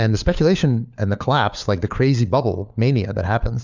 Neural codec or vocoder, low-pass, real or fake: codec, 16 kHz, 4 kbps, FunCodec, trained on LibriTTS, 50 frames a second; 7.2 kHz; fake